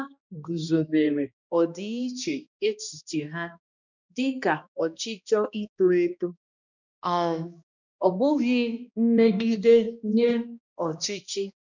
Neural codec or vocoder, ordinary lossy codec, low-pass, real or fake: codec, 16 kHz, 1 kbps, X-Codec, HuBERT features, trained on balanced general audio; none; 7.2 kHz; fake